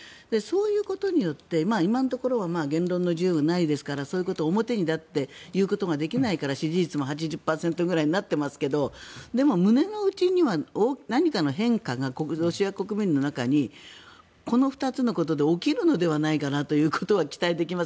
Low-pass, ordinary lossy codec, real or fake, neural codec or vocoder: none; none; real; none